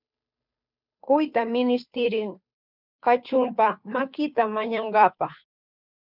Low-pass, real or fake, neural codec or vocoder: 5.4 kHz; fake; codec, 16 kHz, 2 kbps, FunCodec, trained on Chinese and English, 25 frames a second